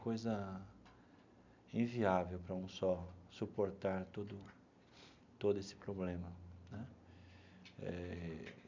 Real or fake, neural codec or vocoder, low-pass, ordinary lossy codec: real; none; 7.2 kHz; MP3, 64 kbps